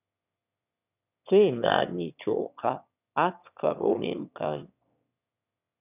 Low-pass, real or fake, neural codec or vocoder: 3.6 kHz; fake; autoencoder, 22.05 kHz, a latent of 192 numbers a frame, VITS, trained on one speaker